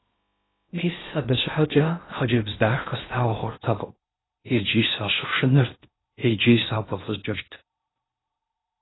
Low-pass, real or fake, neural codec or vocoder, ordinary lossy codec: 7.2 kHz; fake; codec, 16 kHz in and 24 kHz out, 0.6 kbps, FocalCodec, streaming, 2048 codes; AAC, 16 kbps